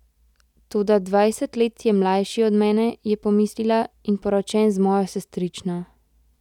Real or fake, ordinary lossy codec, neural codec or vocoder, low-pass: real; none; none; 19.8 kHz